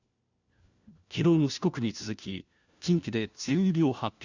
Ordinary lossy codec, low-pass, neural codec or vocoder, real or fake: Opus, 64 kbps; 7.2 kHz; codec, 16 kHz, 1 kbps, FunCodec, trained on LibriTTS, 50 frames a second; fake